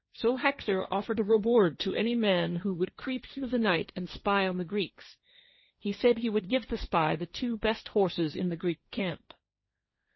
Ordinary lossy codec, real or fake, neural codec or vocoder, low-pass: MP3, 24 kbps; fake; codec, 16 kHz, 1.1 kbps, Voila-Tokenizer; 7.2 kHz